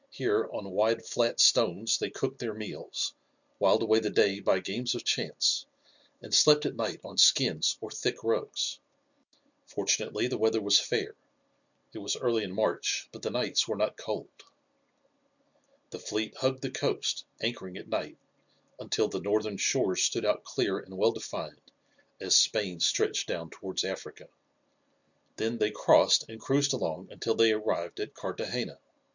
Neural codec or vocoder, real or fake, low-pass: none; real; 7.2 kHz